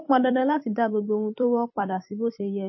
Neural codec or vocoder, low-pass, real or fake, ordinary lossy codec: vocoder, 44.1 kHz, 128 mel bands every 256 samples, BigVGAN v2; 7.2 kHz; fake; MP3, 24 kbps